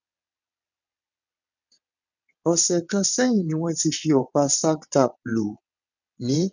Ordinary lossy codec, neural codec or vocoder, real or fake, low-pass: none; vocoder, 22.05 kHz, 80 mel bands, WaveNeXt; fake; 7.2 kHz